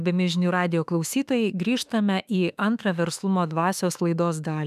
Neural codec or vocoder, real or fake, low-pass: autoencoder, 48 kHz, 32 numbers a frame, DAC-VAE, trained on Japanese speech; fake; 14.4 kHz